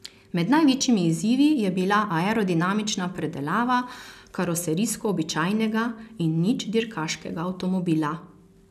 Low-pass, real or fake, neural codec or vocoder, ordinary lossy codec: 14.4 kHz; real; none; none